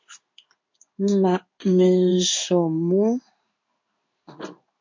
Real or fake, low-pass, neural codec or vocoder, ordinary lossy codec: fake; 7.2 kHz; codec, 16 kHz in and 24 kHz out, 1 kbps, XY-Tokenizer; MP3, 48 kbps